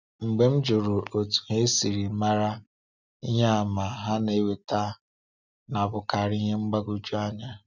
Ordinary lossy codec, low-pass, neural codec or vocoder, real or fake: none; 7.2 kHz; none; real